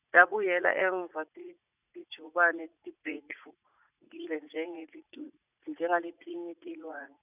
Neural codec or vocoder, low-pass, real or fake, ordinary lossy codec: vocoder, 44.1 kHz, 80 mel bands, Vocos; 3.6 kHz; fake; none